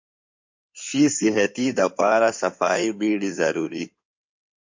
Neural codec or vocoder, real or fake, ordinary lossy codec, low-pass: codec, 16 kHz in and 24 kHz out, 2.2 kbps, FireRedTTS-2 codec; fake; MP3, 48 kbps; 7.2 kHz